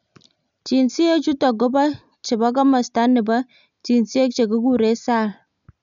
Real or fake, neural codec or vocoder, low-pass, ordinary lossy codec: real; none; 7.2 kHz; none